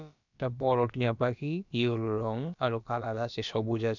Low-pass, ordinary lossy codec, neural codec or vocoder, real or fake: 7.2 kHz; none; codec, 16 kHz, about 1 kbps, DyCAST, with the encoder's durations; fake